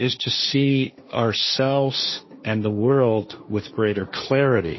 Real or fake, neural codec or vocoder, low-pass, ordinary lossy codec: fake; codec, 16 kHz, 1.1 kbps, Voila-Tokenizer; 7.2 kHz; MP3, 24 kbps